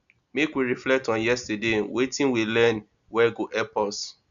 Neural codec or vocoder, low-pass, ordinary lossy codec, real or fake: none; 7.2 kHz; none; real